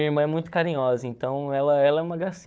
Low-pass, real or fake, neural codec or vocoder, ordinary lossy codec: none; fake; codec, 16 kHz, 4 kbps, FunCodec, trained on Chinese and English, 50 frames a second; none